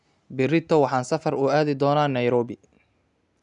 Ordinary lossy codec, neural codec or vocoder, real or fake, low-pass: none; none; real; 10.8 kHz